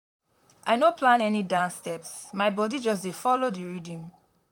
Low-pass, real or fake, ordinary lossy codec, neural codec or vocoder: 19.8 kHz; fake; none; vocoder, 44.1 kHz, 128 mel bands, Pupu-Vocoder